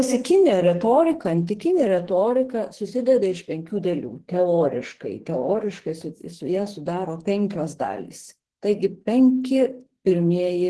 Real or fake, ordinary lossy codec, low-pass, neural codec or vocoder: fake; Opus, 16 kbps; 10.8 kHz; codec, 44.1 kHz, 2.6 kbps, SNAC